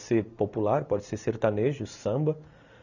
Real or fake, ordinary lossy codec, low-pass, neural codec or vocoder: real; none; 7.2 kHz; none